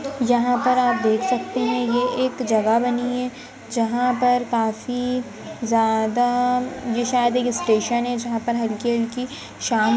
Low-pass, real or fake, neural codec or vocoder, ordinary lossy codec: none; real; none; none